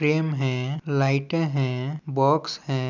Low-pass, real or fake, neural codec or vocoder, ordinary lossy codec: 7.2 kHz; real; none; none